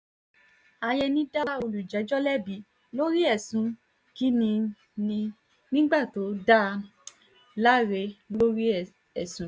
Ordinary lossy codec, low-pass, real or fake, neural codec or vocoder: none; none; real; none